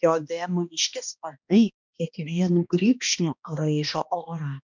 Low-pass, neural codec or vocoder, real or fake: 7.2 kHz; codec, 16 kHz, 1 kbps, X-Codec, HuBERT features, trained on balanced general audio; fake